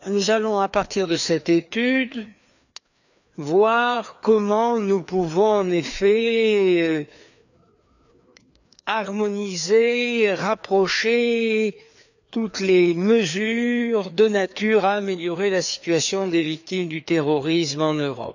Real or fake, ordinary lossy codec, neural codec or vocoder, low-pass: fake; none; codec, 16 kHz, 2 kbps, FreqCodec, larger model; 7.2 kHz